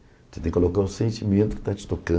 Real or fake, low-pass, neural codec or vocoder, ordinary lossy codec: real; none; none; none